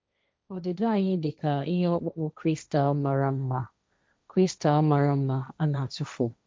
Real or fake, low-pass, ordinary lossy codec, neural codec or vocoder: fake; none; none; codec, 16 kHz, 1.1 kbps, Voila-Tokenizer